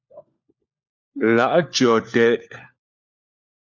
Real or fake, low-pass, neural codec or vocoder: fake; 7.2 kHz; codec, 16 kHz, 4 kbps, FunCodec, trained on LibriTTS, 50 frames a second